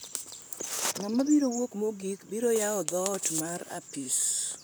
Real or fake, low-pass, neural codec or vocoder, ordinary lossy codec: fake; none; vocoder, 44.1 kHz, 128 mel bands, Pupu-Vocoder; none